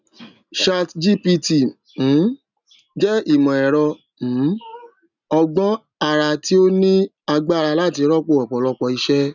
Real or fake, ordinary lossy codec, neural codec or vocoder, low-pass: real; none; none; 7.2 kHz